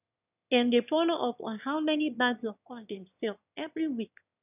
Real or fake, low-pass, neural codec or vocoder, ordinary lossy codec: fake; 3.6 kHz; autoencoder, 22.05 kHz, a latent of 192 numbers a frame, VITS, trained on one speaker; none